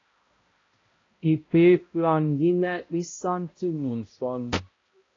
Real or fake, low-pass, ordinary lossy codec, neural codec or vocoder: fake; 7.2 kHz; AAC, 32 kbps; codec, 16 kHz, 0.5 kbps, X-Codec, HuBERT features, trained on balanced general audio